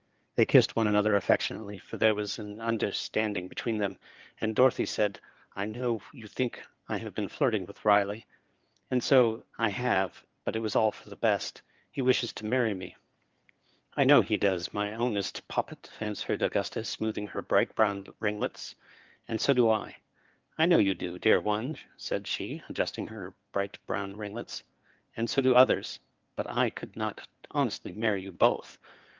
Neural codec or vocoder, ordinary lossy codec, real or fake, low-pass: codec, 16 kHz in and 24 kHz out, 2.2 kbps, FireRedTTS-2 codec; Opus, 24 kbps; fake; 7.2 kHz